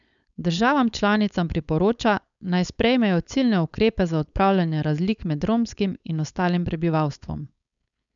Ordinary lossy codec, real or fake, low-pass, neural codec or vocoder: none; fake; 7.2 kHz; codec, 16 kHz, 4.8 kbps, FACodec